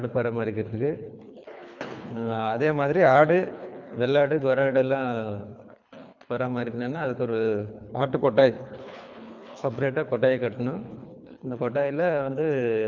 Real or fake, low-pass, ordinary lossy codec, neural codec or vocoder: fake; 7.2 kHz; none; codec, 24 kHz, 3 kbps, HILCodec